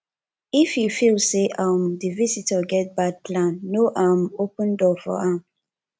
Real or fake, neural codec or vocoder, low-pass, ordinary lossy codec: real; none; none; none